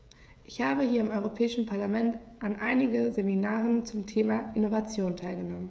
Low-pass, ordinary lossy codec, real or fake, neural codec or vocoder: none; none; fake; codec, 16 kHz, 16 kbps, FreqCodec, smaller model